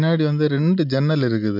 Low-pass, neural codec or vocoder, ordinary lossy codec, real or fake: 5.4 kHz; none; MP3, 48 kbps; real